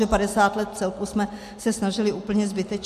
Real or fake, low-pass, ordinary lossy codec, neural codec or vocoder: real; 14.4 kHz; MP3, 64 kbps; none